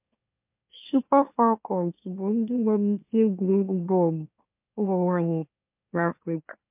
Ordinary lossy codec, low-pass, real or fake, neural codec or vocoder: MP3, 32 kbps; 3.6 kHz; fake; autoencoder, 44.1 kHz, a latent of 192 numbers a frame, MeloTTS